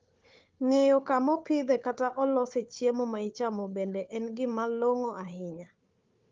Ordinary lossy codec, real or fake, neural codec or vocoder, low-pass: Opus, 32 kbps; fake; codec, 16 kHz, 4 kbps, FunCodec, trained on Chinese and English, 50 frames a second; 7.2 kHz